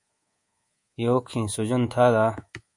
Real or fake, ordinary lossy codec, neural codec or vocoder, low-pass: fake; MP3, 48 kbps; codec, 24 kHz, 3.1 kbps, DualCodec; 10.8 kHz